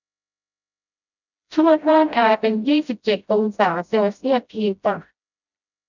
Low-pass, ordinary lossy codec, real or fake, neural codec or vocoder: 7.2 kHz; none; fake; codec, 16 kHz, 0.5 kbps, FreqCodec, smaller model